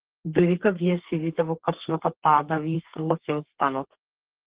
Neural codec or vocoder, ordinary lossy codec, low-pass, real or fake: codec, 32 kHz, 1.9 kbps, SNAC; Opus, 16 kbps; 3.6 kHz; fake